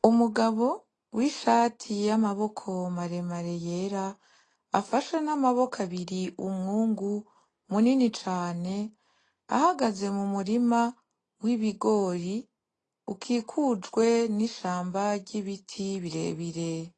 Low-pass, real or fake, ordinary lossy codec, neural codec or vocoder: 9.9 kHz; real; AAC, 32 kbps; none